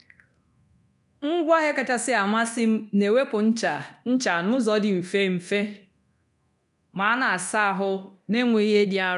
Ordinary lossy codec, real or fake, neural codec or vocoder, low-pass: none; fake; codec, 24 kHz, 0.9 kbps, DualCodec; 10.8 kHz